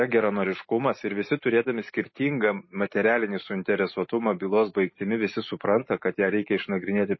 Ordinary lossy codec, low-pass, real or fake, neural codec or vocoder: MP3, 24 kbps; 7.2 kHz; real; none